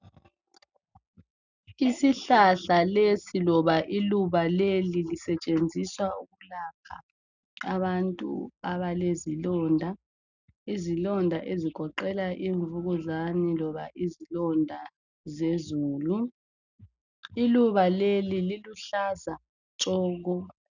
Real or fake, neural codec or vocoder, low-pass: real; none; 7.2 kHz